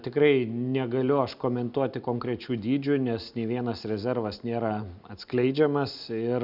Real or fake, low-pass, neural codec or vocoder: real; 5.4 kHz; none